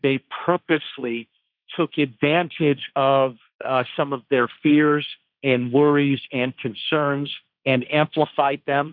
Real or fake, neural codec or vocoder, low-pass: fake; codec, 16 kHz, 1.1 kbps, Voila-Tokenizer; 5.4 kHz